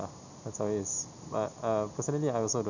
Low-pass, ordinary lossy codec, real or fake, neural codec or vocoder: 7.2 kHz; none; real; none